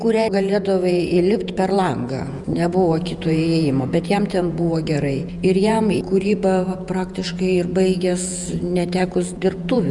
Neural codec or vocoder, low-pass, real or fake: vocoder, 48 kHz, 128 mel bands, Vocos; 10.8 kHz; fake